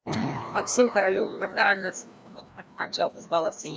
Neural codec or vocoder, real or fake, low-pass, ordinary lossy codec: codec, 16 kHz, 1 kbps, FreqCodec, larger model; fake; none; none